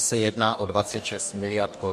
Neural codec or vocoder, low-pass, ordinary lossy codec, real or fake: codec, 44.1 kHz, 2.6 kbps, DAC; 14.4 kHz; MP3, 64 kbps; fake